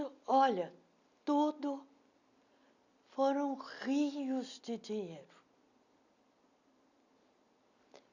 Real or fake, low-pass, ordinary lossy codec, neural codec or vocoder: real; 7.2 kHz; none; none